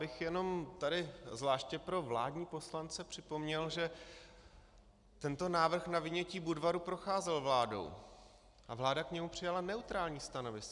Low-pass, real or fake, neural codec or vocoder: 10.8 kHz; real; none